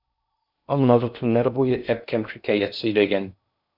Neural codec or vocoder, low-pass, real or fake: codec, 16 kHz in and 24 kHz out, 0.6 kbps, FocalCodec, streaming, 4096 codes; 5.4 kHz; fake